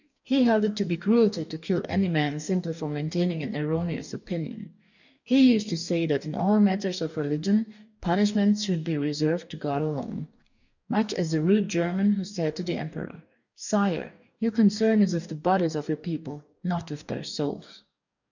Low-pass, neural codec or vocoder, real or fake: 7.2 kHz; codec, 44.1 kHz, 2.6 kbps, DAC; fake